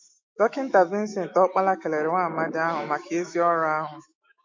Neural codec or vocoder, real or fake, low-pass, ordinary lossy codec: autoencoder, 48 kHz, 128 numbers a frame, DAC-VAE, trained on Japanese speech; fake; 7.2 kHz; MP3, 48 kbps